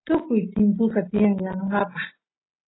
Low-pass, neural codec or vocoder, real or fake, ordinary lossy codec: 7.2 kHz; none; real; AAC, 16 kbps